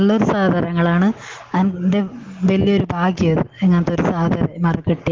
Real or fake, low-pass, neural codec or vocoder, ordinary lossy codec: real; 7.2 kHz; none; Opus, 16 kbps